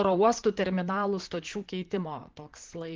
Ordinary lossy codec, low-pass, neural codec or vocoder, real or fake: Opus, 16 kbps; 7.2 kHz; vocoder, 44.1 kHz, 128 mel bands, Pupu-Vocoder; fake